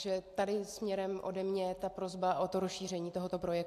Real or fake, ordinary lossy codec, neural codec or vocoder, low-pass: real; AAC, 64 kbps; none; 14.4 kHz